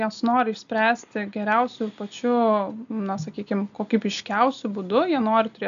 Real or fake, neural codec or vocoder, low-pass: real; none; 7.2 kHz